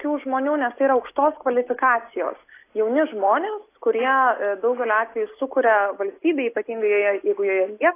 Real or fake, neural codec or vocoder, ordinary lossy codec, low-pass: real; none; AAC, 24 kbps; 3.6 kHz